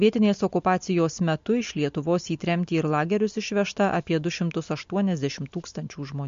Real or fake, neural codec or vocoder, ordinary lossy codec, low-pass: real; none; MP3, 48 kbps; 7.2 kHz